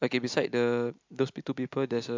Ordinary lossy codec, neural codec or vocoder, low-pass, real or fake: MP3, 64 kbps; none; 7.2 kHz; real